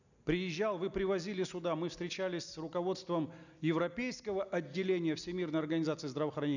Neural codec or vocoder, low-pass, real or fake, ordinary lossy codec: none; 7.2 kHz; real; none